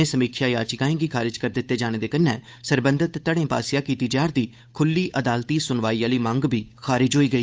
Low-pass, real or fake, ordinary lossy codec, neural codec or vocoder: none; fake; none; codec, 16 kHz, 8 kbps, FunCodec, trained on Chinese and English, 25 frames a second